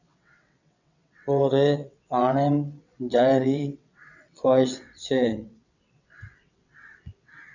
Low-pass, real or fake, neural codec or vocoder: 7.2 kHz; fake; vocoder, 22.05 kHz, 80 mel bands, WaveNeXt